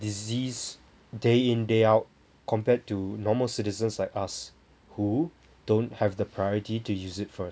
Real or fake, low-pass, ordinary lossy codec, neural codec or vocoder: real; none; none; none